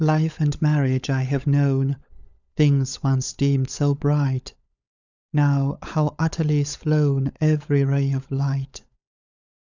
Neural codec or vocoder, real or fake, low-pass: codec, 16 kHz, 16 kbps, FunCodec, trained on LibriTTS, 50 frames a second; fake; 7.2 kHz